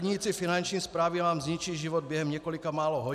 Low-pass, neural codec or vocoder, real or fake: 14.4 kHz; none; real